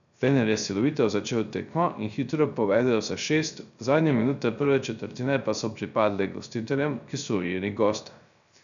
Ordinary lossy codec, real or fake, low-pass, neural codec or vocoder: none; fake; 7.2 kHz; codec, 16 kHz, 0.3 kbps, FocalCodec